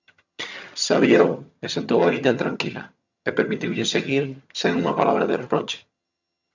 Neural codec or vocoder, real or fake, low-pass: vocoder, 22.05 kHz, 80 mel bands, HiFi-GAN; fake; 7.2 kHz